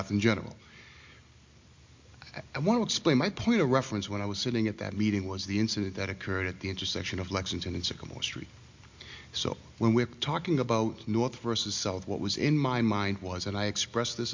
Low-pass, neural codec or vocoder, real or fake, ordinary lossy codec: 7.2 kHz; none; real; MP3, 48 kbps